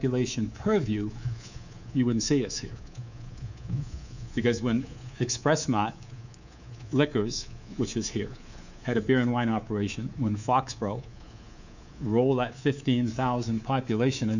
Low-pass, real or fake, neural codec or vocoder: 7.2 kHz; fake; codec, 24 kHz, 3.1 kbps, DualCodec